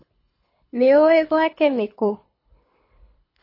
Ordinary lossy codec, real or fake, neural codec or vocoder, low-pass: MP3, 24 kbps; fake; codec, 24 kHz, 6 kbps, HILCodec; 5.4 kHz